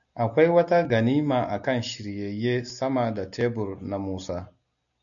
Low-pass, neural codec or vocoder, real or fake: 7.2 kHz; none; real